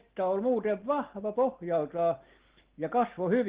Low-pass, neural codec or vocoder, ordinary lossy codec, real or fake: 3.6 kHz; none; Opus, 16 kbps; real